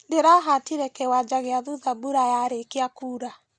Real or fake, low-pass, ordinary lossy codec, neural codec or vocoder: real; 9.9 kHz; Opus, 64 kbps; none